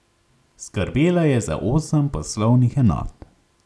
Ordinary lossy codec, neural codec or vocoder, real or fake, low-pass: none; none; real; none